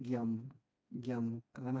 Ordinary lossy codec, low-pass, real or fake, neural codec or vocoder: none; none; fake; codec, 16 kHz, 2 kbps, FreqCodec, smaller model